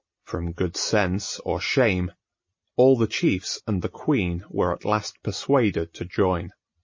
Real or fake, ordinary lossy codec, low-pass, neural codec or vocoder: real; MP3, 32 kbps; 7.2 kHz; none